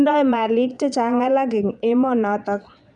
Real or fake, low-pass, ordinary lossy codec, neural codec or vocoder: fake; 9.9 kHz; none; vocoder, 22.05 kHz, 80 mel bands, Vocos